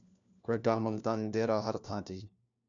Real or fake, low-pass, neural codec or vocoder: fake; 7.2 kHz; codec, 16 kHz, 1 kbps, FunCodec, trained on LibriTTS, 50 frames a second